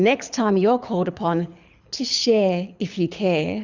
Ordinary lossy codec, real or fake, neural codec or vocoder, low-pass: Opus, 64 kbps; fake; codec, 24 kHz, 6 kbps, HILCodec; 7.2 kHz